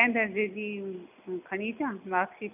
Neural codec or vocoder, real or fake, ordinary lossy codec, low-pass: none; real; none; 3.6 kHz